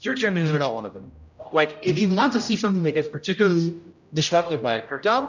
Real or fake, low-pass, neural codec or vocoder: fake; 7.2 kHz; codec, 16 kHz, 0.5 kbps, X-Codec, HuBERT features, trained on general audio